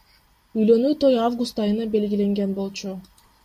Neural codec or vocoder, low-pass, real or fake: none; 14.4 kHz; real